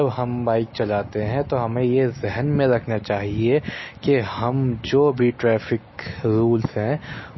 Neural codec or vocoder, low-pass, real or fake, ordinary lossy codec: none; 7.2 kHz; real; MP3, 24 kbps